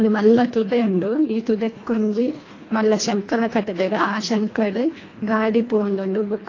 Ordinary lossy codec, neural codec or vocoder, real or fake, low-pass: AAC, 32 kbps; codec, 24 kHz, 1.5 kbps, HILCodec; fake; 7.2 kHz